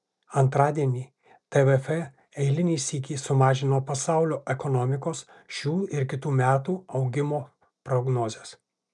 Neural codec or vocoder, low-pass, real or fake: none; 10.8 kHz; real